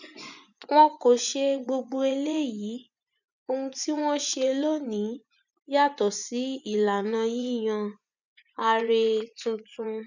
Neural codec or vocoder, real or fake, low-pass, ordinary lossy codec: vocoder, 24 kHz, 100 mel bands, Vocos; fake; 7.2 kHz; none